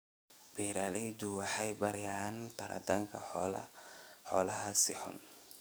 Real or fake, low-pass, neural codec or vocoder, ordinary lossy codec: fake; none; codec, 44.1 kHz, 7.8 kbps, DAC; none